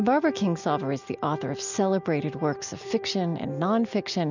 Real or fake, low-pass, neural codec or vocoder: real; 7.2 kHz; none